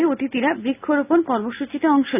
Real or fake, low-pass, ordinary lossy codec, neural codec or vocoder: real; 3.6 kHz; none; none